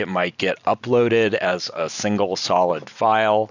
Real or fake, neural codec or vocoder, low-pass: real; none; 7.2 kHz